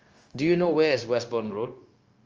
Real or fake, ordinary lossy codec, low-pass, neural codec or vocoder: fake; Opus, 24 kbps; 7.2 kHz; codec, 16 kHz, 0.9 kbps, LongCat-Audio-Codec